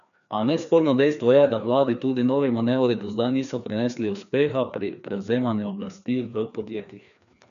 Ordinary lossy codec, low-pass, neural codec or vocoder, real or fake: none; 7.2 kHz; codec, 16 kHz, 2 kbps, FreqCodec, larger model; fake